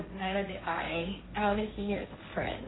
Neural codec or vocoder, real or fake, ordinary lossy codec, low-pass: codec, 16 kHz, 1.1 kbps, Voila-Tokenizer; fake; AAC, 16 kbps; 7.2 kHz